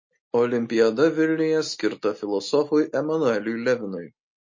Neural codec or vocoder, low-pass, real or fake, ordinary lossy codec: none; 7.2 kHz; real; MP3, 32 kbps